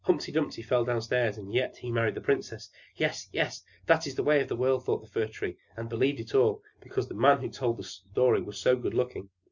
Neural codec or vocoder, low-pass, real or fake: none; 7.2 kHz; real